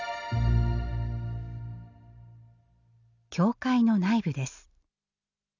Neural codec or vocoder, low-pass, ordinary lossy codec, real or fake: none; 7.2 kHz; none; real